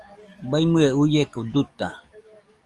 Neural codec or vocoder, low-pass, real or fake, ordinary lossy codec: codec, 44.1 kHz, 7.8 kbps, DAC; 10.8 kHz; fake; Opus, 32 kbps